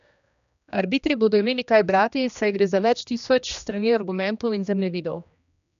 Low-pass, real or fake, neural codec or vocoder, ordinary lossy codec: 7.2 kHz; fake; codec, 16 kHz, 1 kbps, X-Codec, HuBERT features, trained on general audio; none